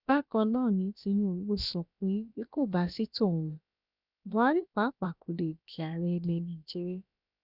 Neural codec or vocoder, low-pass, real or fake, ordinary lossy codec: codec, 16 kHz, about 1 kbps, DyCAST, with the encoder's durations; 5.4 kHz; fake; none